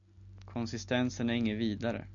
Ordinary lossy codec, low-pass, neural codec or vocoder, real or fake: MP3, 64 kbps; 7.2 kHz; none; real